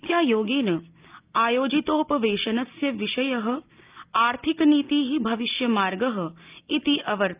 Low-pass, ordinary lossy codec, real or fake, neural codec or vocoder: 3.6 kHz; Opus, 24 kbps; real; none